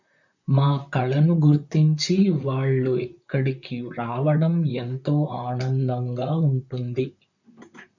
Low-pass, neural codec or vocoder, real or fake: 7.2 kHz; vocoder, 44.1 kHz, 128 mel bands, Pupu-Vocoder; fake